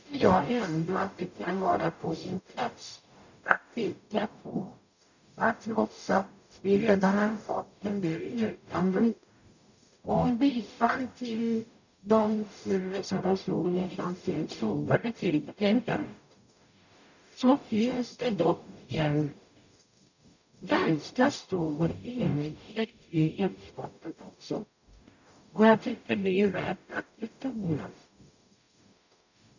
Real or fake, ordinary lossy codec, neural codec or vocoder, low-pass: fake; none; codec, 44.1 kHz, 0.9 kbps, DAC; 7.2 kHz